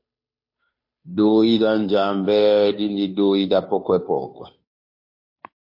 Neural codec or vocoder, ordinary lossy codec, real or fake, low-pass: codec, 16 kHz, 2 kbps, FunCodec, trained on Chinese and English, 25 frames a second; MP3, 32 kbps; fake; 5.4 kHz